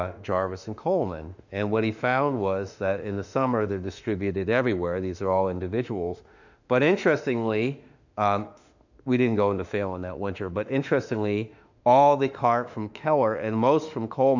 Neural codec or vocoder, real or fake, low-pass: autoencoder, 48 kHz, 32 numbers a frame, DAC-VAE, trained on Japanese speech; fake; 7.2 kHz